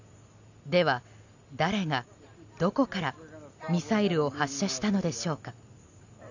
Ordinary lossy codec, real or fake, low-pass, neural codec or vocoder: none; real; 7.2 kHz; none